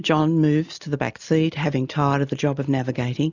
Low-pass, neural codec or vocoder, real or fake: 7.2 kHz; none; real